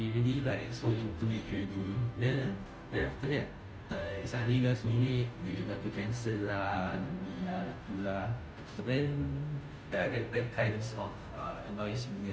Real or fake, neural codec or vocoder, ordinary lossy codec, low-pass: fake; codec, 16 kHz, 0.5 kbps, FunCodec, trained on Chinese and English, 25 frames a second; none; none